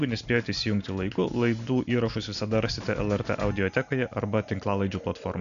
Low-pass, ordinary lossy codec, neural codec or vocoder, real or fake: 7.2 kHz; MP3, 96 kbps; none; real